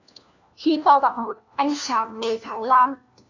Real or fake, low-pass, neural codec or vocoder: fake; 7.2 kHz; codec, 16 kHz, 1 kbps, FunCodec, trained on LibriTTS, 50 frames a second